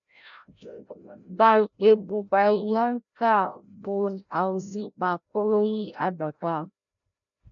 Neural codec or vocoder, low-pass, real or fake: codec, 16 kHz, 0.5 kbps, FreqCodec, larger model; 7.2 kHz; fake